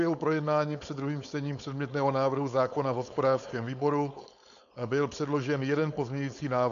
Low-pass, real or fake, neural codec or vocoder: 7.2 kHz; fake; codec, 16 kHz, 4.8 kbps, FACodec